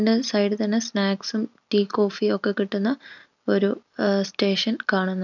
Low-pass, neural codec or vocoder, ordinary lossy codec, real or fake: 7.2 kHz; none; none; real